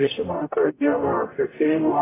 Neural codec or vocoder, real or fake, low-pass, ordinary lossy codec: codec, 44.1 kHz, 0.9 kbps, DAC; fake; 3.6 kHz; AAC, 16 kbps